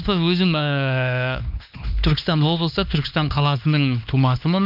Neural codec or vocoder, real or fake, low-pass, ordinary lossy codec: codec, 16 kHz, 2 kbps, X-Codec, HuBERT features, trained on LibriSpeech; fake; 5.4 kHz; none